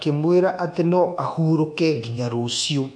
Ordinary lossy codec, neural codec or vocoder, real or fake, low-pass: none; codec, 24 kHz, 1.2 kbps, DualCodec; fake; 9.9 kHz